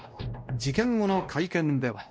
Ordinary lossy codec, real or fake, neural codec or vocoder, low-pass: none; fake; codec, 16 kHz, 1 kbps, X-Codec, WavLM features, trained on Multilingual LibriSpeech; none